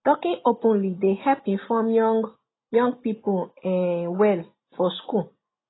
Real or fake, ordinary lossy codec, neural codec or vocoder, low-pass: real; AAC, 16 kbps; none; 7.2 kHz